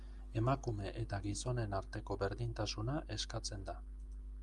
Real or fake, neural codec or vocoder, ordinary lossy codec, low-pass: real; none; Opus, 32 kbps; 10.8 kHz